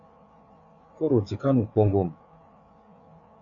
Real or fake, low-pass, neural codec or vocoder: fake; 7.2 kHz; codec, 16 kHz, 4 kbps, FreqCodec, larger model